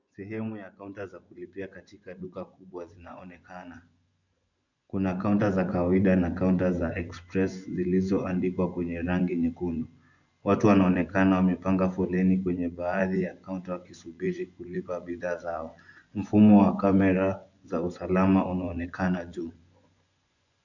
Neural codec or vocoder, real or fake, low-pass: vocoder, 24 kHz, 100 mel bands, Vocos; fake; 7.2 kHz